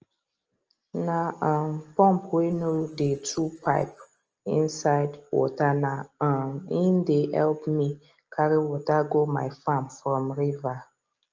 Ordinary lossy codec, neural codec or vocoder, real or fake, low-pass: Opus, 24 kbps; none; real; 7.2 kHz